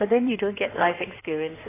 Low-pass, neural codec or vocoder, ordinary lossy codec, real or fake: 3.6 kHz; codec, 16 kHz in and 24 kHz out, 2.2 kbps, FireRedTTS-2 codec; AAC, 16 kbps; fake